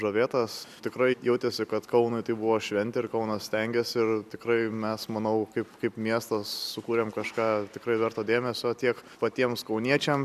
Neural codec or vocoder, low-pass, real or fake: none; 14.4 kHz; real